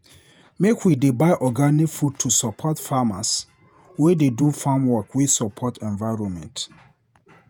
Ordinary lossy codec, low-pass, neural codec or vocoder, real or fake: none; none; vocoder, 48 kHz, 128 mel bands, Vocos; fake